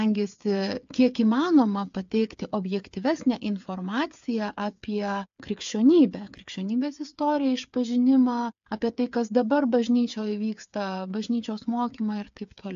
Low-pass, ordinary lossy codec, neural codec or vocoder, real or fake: 7.2 kHz; AAC, 64 kbps; codec, 16 kHz, 8 kbps, FreqCodec, smaller model; fake